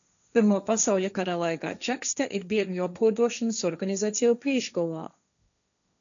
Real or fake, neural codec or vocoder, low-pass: fake; codec, 16 kHz, 1.1 kbps, Voila-Tokenizer; 7.2 kHz